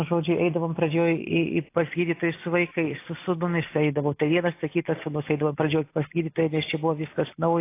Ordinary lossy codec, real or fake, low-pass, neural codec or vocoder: AAC, 24 kbps; real; 3.6 kHz; none